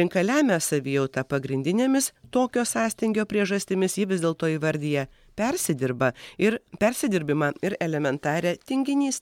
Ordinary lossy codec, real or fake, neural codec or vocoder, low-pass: MP3, 96 kbps; real; none; 19.8 kHz